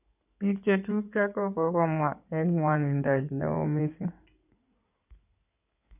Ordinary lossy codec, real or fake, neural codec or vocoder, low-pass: none; fake; codec, 16 kHz in and 24 kHz out, 2.2 kbps, FireRedTTS-2 codec; 3.6 kHz